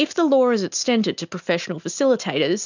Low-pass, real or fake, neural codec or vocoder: 7.2 kHz; real; none